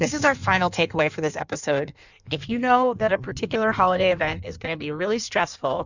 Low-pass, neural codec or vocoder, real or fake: 7.2 kHz; codec, 16 kHz in and 24 kHz out, 1.1 kbps, FireRedTTS-2 codec; fake